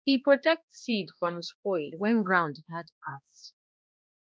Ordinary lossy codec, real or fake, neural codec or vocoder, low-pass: none; fake; codec, 16 kHz, 1 kbps, X-Codec, HuBERT features, trained on balanced general audio; none